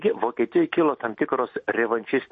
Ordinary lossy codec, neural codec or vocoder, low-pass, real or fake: MP3, 32 kbps; none; 10.8 kHz; real